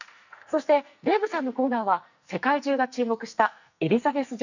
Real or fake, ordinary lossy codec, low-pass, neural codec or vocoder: fake; none; 7.2 kHz; codec, 44.1 kHz, 2.6 kbps, SNAC